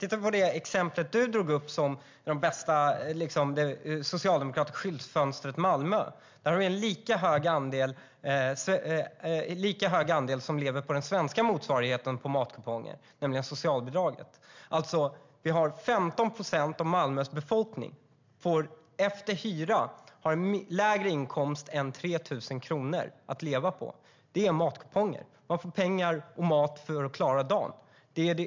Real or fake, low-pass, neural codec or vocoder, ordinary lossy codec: real; 7.2 kHz; none; MP3, 64 kbps